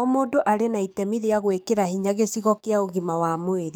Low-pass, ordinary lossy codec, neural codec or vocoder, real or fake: none; none; codec, 44.1 kHz, 7.8 kbps, DAC; fake